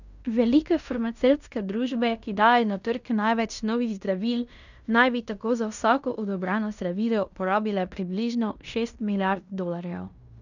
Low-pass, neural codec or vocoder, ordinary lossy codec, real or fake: 7.2 kHz; codec, 16 kHz in and 24 kHz out, 0.9 kbps, LongCat-Audio-Codec, fine tuned four codebook decoder; none; fake